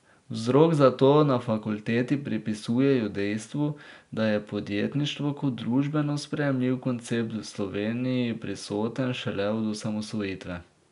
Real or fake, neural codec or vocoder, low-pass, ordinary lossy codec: real; none; 10.8 kHz; none